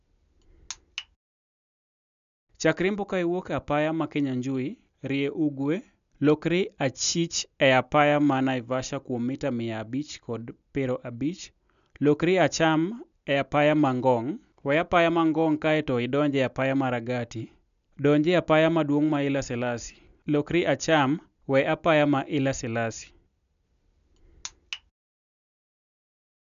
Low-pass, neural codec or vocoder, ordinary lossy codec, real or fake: 7.2 kHz; none; none; real